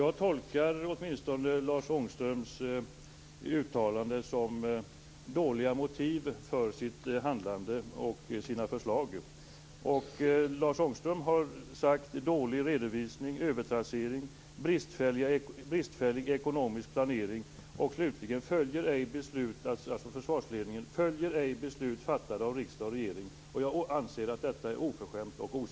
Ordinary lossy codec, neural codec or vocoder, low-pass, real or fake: none; none; none; real